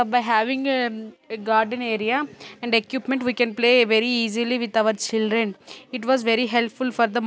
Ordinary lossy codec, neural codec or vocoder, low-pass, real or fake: none; none; none; real